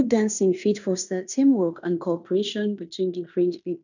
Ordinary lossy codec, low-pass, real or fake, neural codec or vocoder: none; 7.2 kHz; fake; codec, 16 kHz in and 24 kHz out, 0.9 kbps, LongCat-Audio-Codec, fine tuned four codebook decoder